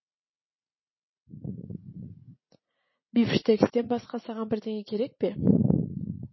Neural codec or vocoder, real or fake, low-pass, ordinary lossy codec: vocoder, 44.1 kHz, 80 mel bands, Vocos; fake; 7.2 kHz; MP3, 24 kbps